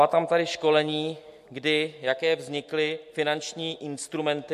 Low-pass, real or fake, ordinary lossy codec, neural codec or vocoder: 14.4 kHz; real; MP3, 64 kbps; none